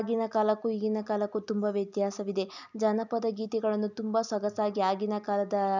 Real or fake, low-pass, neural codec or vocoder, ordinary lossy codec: real; 7.2 kHz; none; none